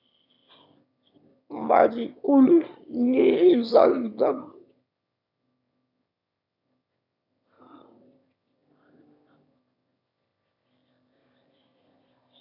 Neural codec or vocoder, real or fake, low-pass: autoencoder, 22.05 kHz, a latent of 192 numbers a frame, VITS, trained on one speaker; fake; 5.4 kHz